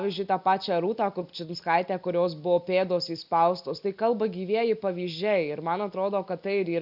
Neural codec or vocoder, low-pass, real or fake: none; 5.4 kHz; real